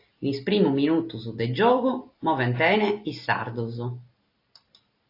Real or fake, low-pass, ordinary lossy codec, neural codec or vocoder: real; 5.4 kHz; AAC, 32 kbps; none